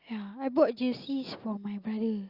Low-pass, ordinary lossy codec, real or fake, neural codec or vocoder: 5.4 kHz; Opus, 64 kbps; real; none